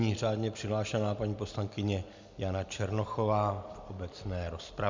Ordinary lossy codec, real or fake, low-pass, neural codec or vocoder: MP3, 64 kbps; real; 7.2 kHz; none